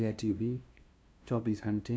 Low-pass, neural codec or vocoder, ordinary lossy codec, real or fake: none; codec, 16 kHz, 0.5 kbps, FunCodec, trained on LibriTTS, 25 frames a second; none; fake